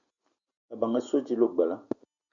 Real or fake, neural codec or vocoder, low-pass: real; none; 7.2 kHz